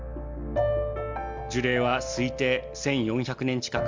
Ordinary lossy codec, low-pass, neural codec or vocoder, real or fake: Opus, 32 kbps; 7.2 kHz; none; real